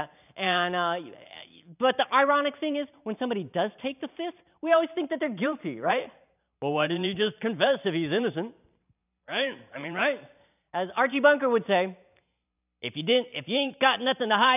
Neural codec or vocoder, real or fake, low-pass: vocoder, 44.1 kHz, 128 mel bands every 512 samples, BigVGAN v2; fake; 3.6 kHz